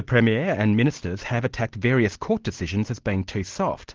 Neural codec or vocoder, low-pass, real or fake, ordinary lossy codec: none; 7.2 kHz; real; Opus, 16 kbps